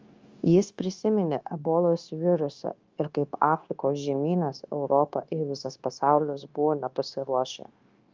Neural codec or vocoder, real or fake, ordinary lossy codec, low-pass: codec, 16 kHz, 0.9 kbps, LongCat-Audio-Codec; fake; Opus, 32 kbps; 7.2 kHz